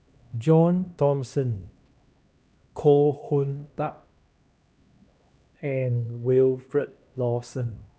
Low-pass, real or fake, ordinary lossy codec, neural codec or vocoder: none; fake; none; codec, 16 kHz, 1 kbps, X-Codec, HuBERT features, trained on LibriSpeech